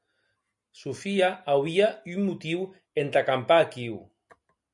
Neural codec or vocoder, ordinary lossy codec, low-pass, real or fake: none; MP3, 64 kbps; 10.8 kHz; real